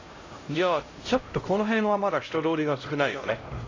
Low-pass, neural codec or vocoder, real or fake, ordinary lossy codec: 7.2 kHz; codec, 16 kHz, 0.5 kbps, X-Codec, HuBERT features, trained on LibriSpeech; fake; AAC, 32 kbps